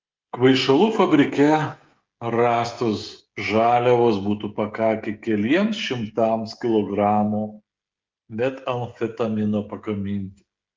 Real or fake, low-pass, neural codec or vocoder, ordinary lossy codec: fake; 7.2 kHz; codec, 16 kHz, 16 kbps, FreqCodec, smaller model; Opus, 32 kbps